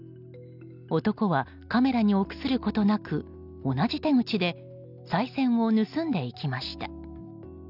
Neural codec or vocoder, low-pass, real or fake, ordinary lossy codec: none; 5.4 kHz; real; none